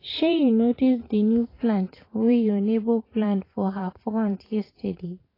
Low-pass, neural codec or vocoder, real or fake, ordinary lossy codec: 5.4 kHz; vocoder, 44.1 kHz, 128 mel bands every 512 samples, BigVGAN v2; fake; AAC, 24 kbps